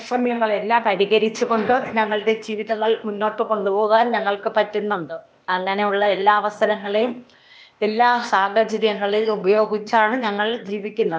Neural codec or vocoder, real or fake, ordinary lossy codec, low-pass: codec, 16 kHz, 0.8 kbps, ZipCodec; fake; none; none